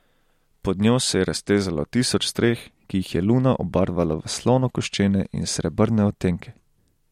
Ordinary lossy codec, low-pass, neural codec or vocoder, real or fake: MP3, 64 kbps; 19.8 kHz; none; real